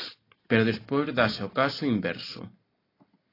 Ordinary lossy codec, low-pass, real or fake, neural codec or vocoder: AAC, 24 kbps; 5.4 kHz; real; none